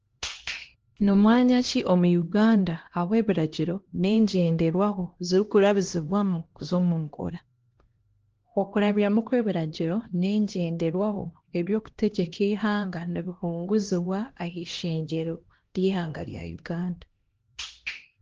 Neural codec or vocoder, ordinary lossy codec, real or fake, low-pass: codec, 16 kHz, 1 kbps, X-Codec, HuBERT features, trained on LibriSpeech; Opus, 16 kbps; fake; 7.2 kHz